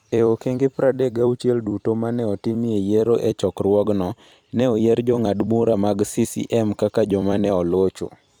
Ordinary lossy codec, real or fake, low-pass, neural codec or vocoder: none; fake; 19.8 kHz; vocoder, 44.1 kHz, 128 mel bands every 256 samples, BigVGAN v2